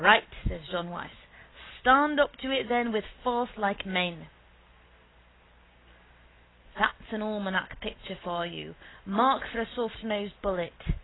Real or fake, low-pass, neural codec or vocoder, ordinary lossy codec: real; 7.2 kHz; none; AAC, 16 kbps